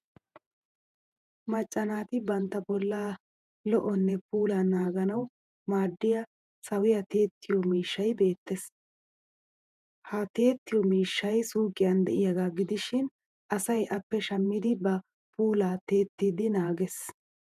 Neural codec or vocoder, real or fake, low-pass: vocoder, 48 kHz, 128 mel bands, Vocos; fake; 14.4 kHz